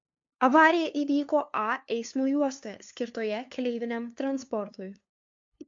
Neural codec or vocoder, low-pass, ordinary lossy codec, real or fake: codec, 16 kHz, 2 kbps, FunCodec, trained on LibriTTS, 25 frames a second; 7.2 kHz; MP3, 48 kbps; fake